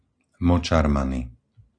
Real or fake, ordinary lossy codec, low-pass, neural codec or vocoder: real; AAC, 48 kbps; 9.9 kHz; none